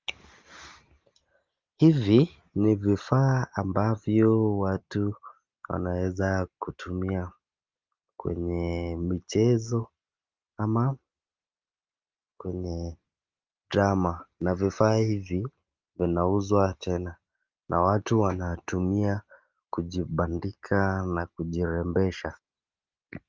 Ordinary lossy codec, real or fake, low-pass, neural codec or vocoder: Opus, 32 kbps; real; 7.2 kHz; none